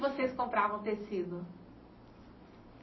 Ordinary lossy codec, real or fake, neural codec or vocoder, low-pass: MP3, 24 kbps; real; none; 7.2 kHz